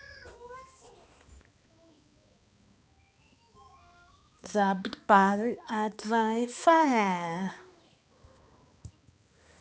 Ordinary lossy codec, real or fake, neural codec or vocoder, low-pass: none; fake; codec, 16 kHz, 2 kbps, X-Codec, HuBERT features, trained on balanced general audio; none